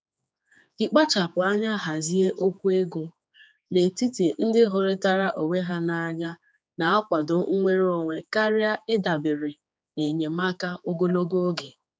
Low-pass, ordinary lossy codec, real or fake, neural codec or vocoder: none; none; fake; codec, 16 kHz, 4 kbps, X-Codec, HuBERT features, trained on general audio